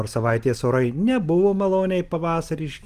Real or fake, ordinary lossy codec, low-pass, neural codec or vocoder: real; Opus, 24 kbps; 14.4 kHz; none